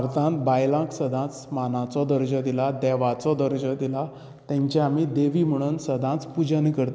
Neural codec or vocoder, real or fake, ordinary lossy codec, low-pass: none; real; none; none